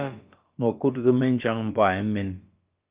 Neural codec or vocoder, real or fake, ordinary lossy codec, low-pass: codec, 16 kHz, about 1 kbps, DyCAST, with the encoder's durations; fake; Opus, 32 kbps; 3.6 kHz